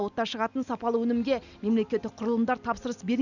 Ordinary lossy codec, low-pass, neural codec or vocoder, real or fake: none; 7.2 kHz; none; real